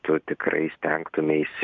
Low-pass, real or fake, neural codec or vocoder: 7.2 kHz; real; none